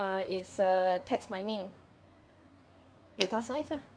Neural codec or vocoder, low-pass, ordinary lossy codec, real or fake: codec, 24 kHz, 1 kbps, SNAC; 9.9 kHz; none; fake